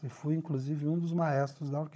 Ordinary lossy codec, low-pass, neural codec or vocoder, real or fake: none; none; codec, 16 kHz, 16 kbps, FunCodec, trained on Chinese and English, 50 frames a second; fake